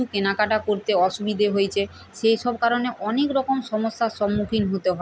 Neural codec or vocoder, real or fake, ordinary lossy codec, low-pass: none; real; none; none